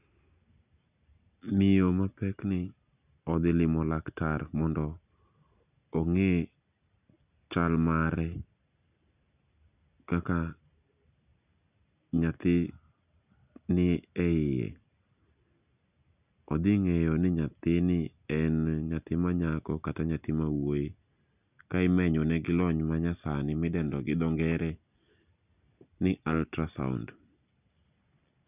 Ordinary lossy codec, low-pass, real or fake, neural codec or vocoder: none; 3.6 kHz; real; none